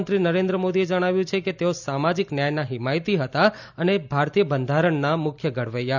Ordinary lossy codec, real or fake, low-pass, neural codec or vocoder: none; real; 7.2 kHz; none